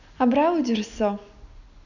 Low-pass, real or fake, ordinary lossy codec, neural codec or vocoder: 7.2 kHz; real; none; none